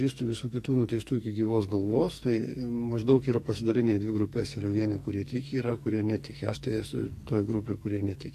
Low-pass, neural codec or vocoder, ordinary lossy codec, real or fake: 14.4 kHz; codec, 44.1 kHz, 2.6 kbps, SNAC; AAC, 64 kbps; fake